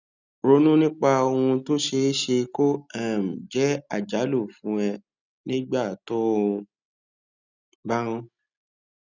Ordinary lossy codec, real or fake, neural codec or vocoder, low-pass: none; real; none; 7.2 kHz